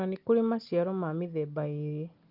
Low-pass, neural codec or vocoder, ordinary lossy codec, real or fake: 5.4 kHz; none; Opus, 32 kbps; real